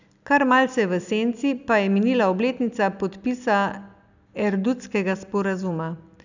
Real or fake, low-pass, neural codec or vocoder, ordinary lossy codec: real; 7.2 kHz; none; none